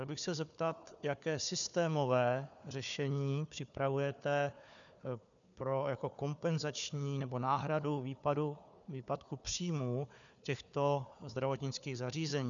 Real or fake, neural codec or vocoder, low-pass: fake; codec, 16 kHz, 4 kbps, FunCodec, trained on Chinese and English, 50 frames a second; 7.2 kHz